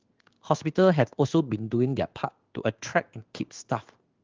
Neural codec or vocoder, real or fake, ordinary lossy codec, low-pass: codec, 16 kHz in and 24 kHz out, 1 kbps, XY-Tokenizer; fake; Opus, 32 kbps; 7.2 kHz